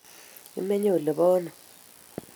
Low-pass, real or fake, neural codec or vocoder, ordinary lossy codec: none; real; none; none